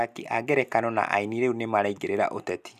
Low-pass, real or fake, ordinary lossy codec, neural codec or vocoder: 14.4 kHz; real; none; none